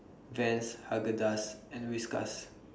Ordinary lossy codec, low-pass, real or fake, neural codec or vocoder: none; none; real; none